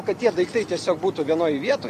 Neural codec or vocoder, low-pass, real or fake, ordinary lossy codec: vocoder, 44.1 kHz, 128 mel bands every 256 samples, BigVGAN v2; 14.4 kHz; fake; MP3, 96 kbps